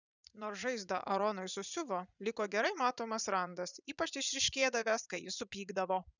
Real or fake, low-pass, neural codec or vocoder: fake; 7.2 kHz; vocoder, 44.1 kHz, 80 mel bands, Vocos